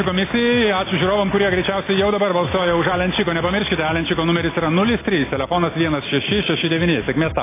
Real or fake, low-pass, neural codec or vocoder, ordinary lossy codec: real; 3.6 kHz; none; AAC, 16 kbps